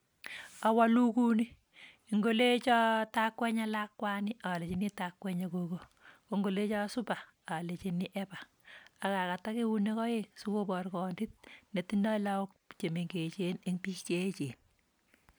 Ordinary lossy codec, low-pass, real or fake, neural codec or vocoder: none; none; real; none